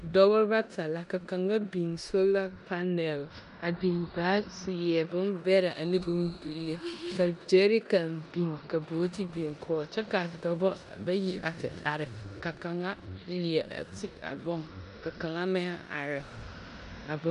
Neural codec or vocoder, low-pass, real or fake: codec, 16 kHz in and 24 kHz out, 0.9 kbps, LongCat-Audio-Codec, four codebook decoder; 10.8 kHz; fake